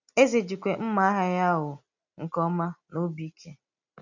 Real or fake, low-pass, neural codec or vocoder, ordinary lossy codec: real; 7.2 kHz; none; none